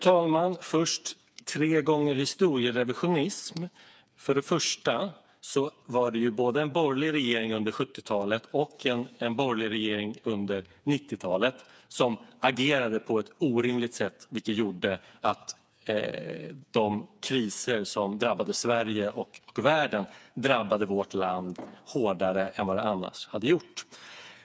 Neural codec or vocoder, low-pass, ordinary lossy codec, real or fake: codec, 16 kHz, 4 kbps, FreqCodec, smaller model; none; none; fake